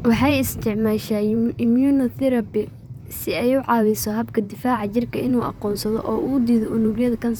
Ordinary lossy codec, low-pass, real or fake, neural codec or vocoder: none; none; fake; vocoder, 44.1 kHz, 128 mel bands, Pupu-Vocoder